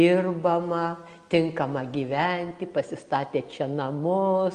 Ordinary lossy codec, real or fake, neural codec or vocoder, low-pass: Opus, 32 kbps; real; none; 9.9 kHz